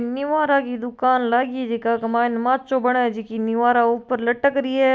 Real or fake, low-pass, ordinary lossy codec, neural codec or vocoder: real; none; none; none